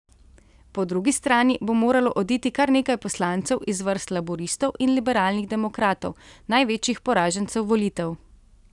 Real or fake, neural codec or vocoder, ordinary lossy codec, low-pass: real; none; none; 10.8 kHz